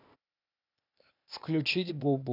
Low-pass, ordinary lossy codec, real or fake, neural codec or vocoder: 5.4 kHz; none; fake; codec, 16 kHz, 0.8 kbps, ZipCodec